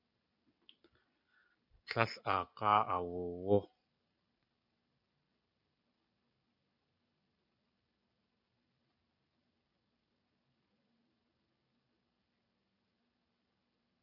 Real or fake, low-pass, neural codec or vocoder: real; 5.4 kHz; none